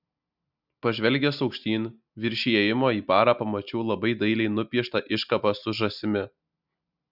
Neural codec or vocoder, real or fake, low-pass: none; real; 5.4 kHz